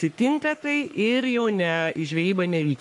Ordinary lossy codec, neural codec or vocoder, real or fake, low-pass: AAC, 64 kbps; codec, 44.1 kHz, 3.4 kbps, Pupu-Codec; fake; 10.8 kHz